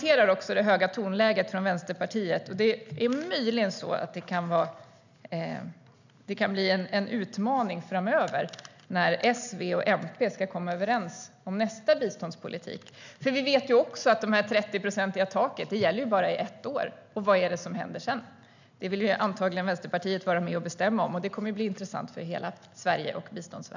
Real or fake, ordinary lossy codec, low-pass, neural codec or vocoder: real; none; 7.2 kHz; none